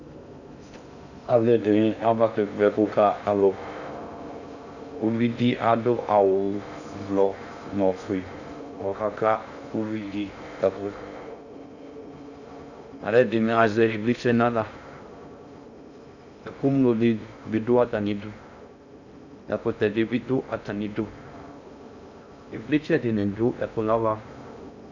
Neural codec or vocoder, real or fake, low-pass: codec, 16 kHz in and 24 kHz out, 0.6 kbps, FocalCodec, streaming, 2048 codes; fake; 7.2 kHz